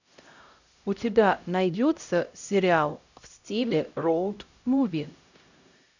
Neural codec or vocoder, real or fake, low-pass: codec, 16 kHz, 0.5 kbps, X-Codec, HuBERT features, trained on LibriSpeech; fake; 7.2 kHz